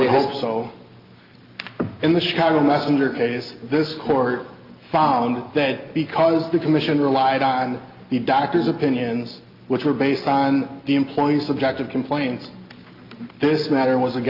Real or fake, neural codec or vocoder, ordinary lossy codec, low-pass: real; none; Opus, 24 kbps; 5.4 kHz